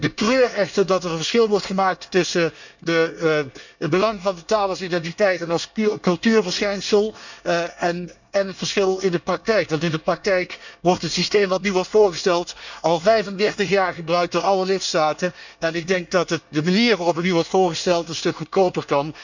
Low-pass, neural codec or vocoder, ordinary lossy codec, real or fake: 7.2 kHz; codec, 24 kHz, 1 kbps, SNAC; none; fake